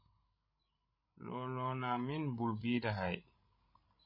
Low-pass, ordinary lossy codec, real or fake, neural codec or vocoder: 5.4 kHz; MP3, 24 kbps; fake; codec, 16 kHz, 16 kbps, FreqCodec, larger model